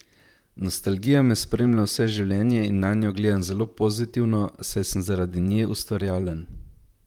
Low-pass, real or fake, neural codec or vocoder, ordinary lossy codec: 19.8 kHz; fake; vocoder, 44.1 kHz, 128 mel bands, Pupu-Vocoder; Opus, 32 kbps